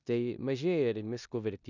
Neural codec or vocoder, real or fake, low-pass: codec, 16 kHz in and 24 kHz out, 0.9 kbps, LongCat-Audio-Codec, four codebook decoder; fake; 7.2 kHz